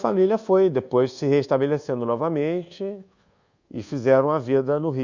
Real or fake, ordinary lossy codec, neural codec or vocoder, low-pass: fake; Opus, 64 kbps; codec, 24 kHz, 1.2 kbps, DualCodec; 7.2 kHz